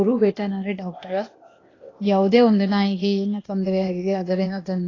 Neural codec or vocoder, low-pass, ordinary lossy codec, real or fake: codec, 16 kHz, 0.8 kbps, ZipCodec; 7.2 kHz; AAC, 32 kbps; fake